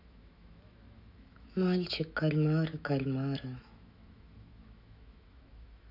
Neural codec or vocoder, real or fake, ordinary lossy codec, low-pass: autoencoder, 48 kHz, 128 numbers a frame, DAC-VAE, trained on Japanese speech; fake; none; 5.4 kHz